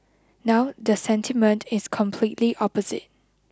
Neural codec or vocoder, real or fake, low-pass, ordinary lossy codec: none; real; none; none